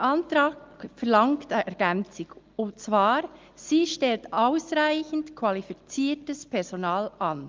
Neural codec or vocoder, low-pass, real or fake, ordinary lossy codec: none; 7.2 kHz; real; Opus, 32 kbps